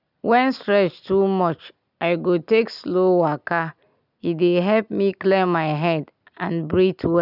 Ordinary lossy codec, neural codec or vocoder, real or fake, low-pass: none; none; real; 5.4 kHz